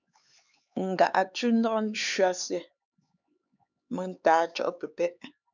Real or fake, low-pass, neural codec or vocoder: fake; 7.2 kHz; codec, 16 kHz, 4 kbps, X-Codec, HuBERT features, trained on LibriSpeech